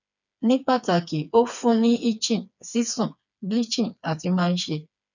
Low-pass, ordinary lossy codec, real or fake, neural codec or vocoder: 7.2 kHz; none; fake; codec, 16 kHz, 4 kbps, FreqCodec, smaller model